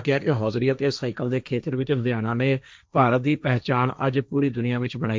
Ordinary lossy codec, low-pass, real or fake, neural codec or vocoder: none; none; fake; codec, 16 kHz, 1.1 kbps, Voila-Tokenizer